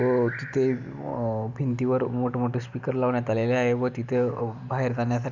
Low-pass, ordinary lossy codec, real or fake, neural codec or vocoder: 7.2 kHz; none; real; none